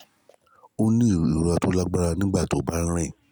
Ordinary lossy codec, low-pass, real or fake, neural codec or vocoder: none; none; real; none